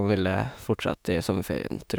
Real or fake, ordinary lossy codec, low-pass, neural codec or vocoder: fake; none; none; autoencoder, 48 kHz, 32 numbers a frame, DAC-VAE, trained on Japanese speech